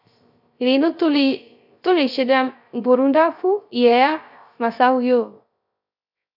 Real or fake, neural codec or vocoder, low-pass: fake; codec, 16 kHz, 0.3 kbps, FocalCodec; 5.4 kHz